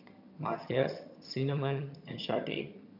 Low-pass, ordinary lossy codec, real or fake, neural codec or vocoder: 5.4 kHz; none; fake; vocoder, 22.05 kHz, 80 mel bands, HiFi-GAN